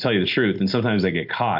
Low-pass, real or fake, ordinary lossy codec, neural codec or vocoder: 5.4 kHz; real; AAC, 48 kbps; none